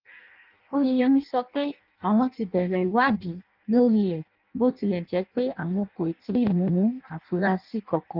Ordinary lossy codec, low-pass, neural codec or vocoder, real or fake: Opus, 32 kbps; 5.4 kHz; codec, 16 kHz in and 24 kHz out, 0.6 kbps, FireRedTTS-2 codec; fake